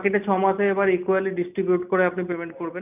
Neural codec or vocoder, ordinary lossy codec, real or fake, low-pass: none; none; real; 3.6 kHz